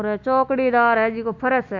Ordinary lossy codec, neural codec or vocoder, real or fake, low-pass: MP3, 64 kbps; none; real; 7.2 kHz